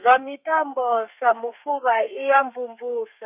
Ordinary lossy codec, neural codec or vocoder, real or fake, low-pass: none; codec, 32 kHz, 1.9 kbps, SNAC; fake; 3.6 kHz